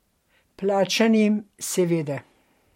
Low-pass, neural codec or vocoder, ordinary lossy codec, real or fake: 19.8 kHz; vocoder, 44.1 kHz, 128 mel bands every 512 samples, BigVGAN v2; MP3, 64 kbps; fake